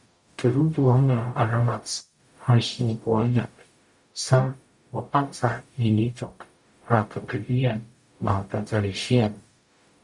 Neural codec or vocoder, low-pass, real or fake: codec, 44.1 kHz, 0.9 kbps, DAC; 10.8 kHz; fake